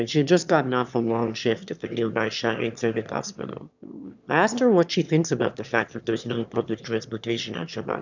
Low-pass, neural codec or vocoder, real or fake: 7.2 kHz; autoencoder, 22.05 kHz, a latent of 192 numbers a frame, VITS, trained on one speaker; fake